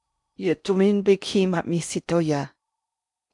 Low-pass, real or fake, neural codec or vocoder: 10.8 kHz; fake; codec, 16 kHz in and 24 kHz out, 0.6 kbps, FocalCodec, streaming, 4096 codes